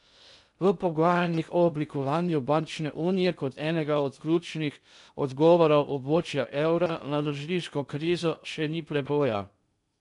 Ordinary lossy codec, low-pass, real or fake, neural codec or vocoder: none; 10.8 kHz; fake; codec, 16 kHz in and 24 kHz out, 0.6 kbps, FocalCodec, streaming, 2048 codes